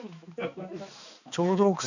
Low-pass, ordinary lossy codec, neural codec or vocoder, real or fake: 7.2 kHz; none; codec, 16 kHz, 2 kbps, X-Codec, HuBERT features, trained on balanced general audio; fake